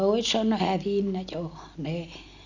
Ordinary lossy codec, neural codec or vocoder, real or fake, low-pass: none; none; real; 7.2 kHz